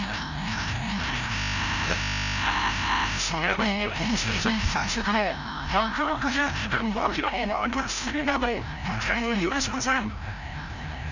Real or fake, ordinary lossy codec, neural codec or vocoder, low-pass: fake; none; codec, 16 kHz, 0.5 kbps, FreqCodec, larger model; 7.2 kHz